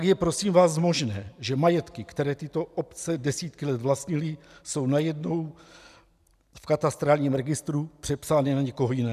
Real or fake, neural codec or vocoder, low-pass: real; none; 14.4 kHz